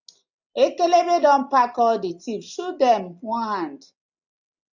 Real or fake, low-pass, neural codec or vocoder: real; 7.2 kHz; none